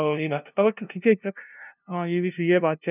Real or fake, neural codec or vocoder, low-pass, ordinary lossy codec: fake; codec, 16 kHz, 0.5 kbps, FunCodec, trained on LibriTTS, 25 frames a second; 3.6 kHz; none